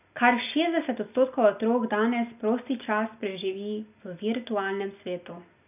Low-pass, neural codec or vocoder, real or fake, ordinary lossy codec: 3.6 kHz; none; real; none